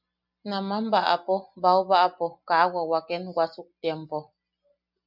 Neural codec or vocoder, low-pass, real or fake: none; 5.4 kHz; real